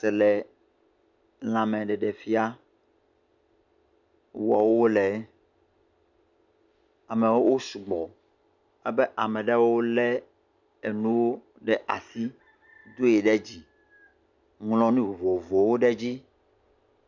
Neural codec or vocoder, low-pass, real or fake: none; 7.2 kHz; real